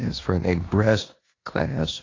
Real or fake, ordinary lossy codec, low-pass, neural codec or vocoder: fake; AAC, 32 kbps; 7.2 kHz; codec, 16 kHz in and 24 kHz out, 0.9 kbps, LongCat-Audio-Codec, four codebook decoder